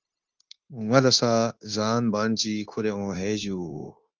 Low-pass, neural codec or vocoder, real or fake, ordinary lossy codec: 7.2 kHz; codec, 16 kHz, 0.9 kbps, LongCat-Audio-Codec; fake; Opus, 24 kbps